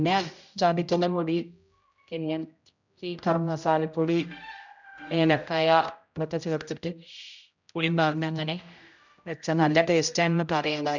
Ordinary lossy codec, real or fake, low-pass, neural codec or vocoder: none; fake; 7.2 kHz; codec, 16 kHz, 0.5 kbps, X-Codec, HuBERT features, trained on general audio